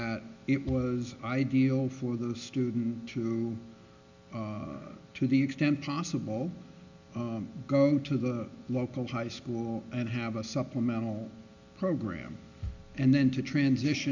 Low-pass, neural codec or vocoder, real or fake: 7.2 kHz; none; real